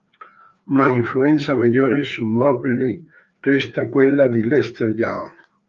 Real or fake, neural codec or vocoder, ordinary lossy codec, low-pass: fake; codec, 16 kHz, 2 kbps, FreqCodec, larger model; Opus, 32 kbps; 7.2 kHz